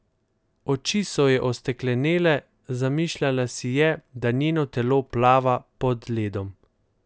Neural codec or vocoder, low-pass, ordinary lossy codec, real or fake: none; none; none; real